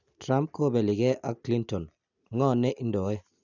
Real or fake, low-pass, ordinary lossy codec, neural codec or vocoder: real; 7.2 kHz; none; none